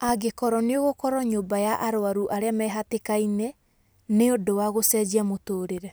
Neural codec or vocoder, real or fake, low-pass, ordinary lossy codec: none; real; none; none